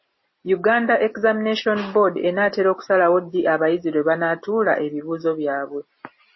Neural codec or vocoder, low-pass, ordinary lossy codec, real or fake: none; 7.2 kHz; MP3, 24 kbps; real